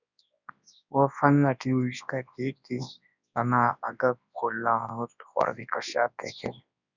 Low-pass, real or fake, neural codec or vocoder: 7.2 kHz; fake; codec, 24 kHz, 0.9 kbps, WavTokenizer, large speech release